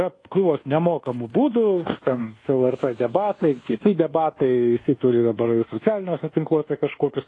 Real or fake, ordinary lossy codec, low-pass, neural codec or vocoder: fake; AAC, 32 kbps; 10.8 kHz; codec, 24 kHz, 1.2 kbps, DualCodec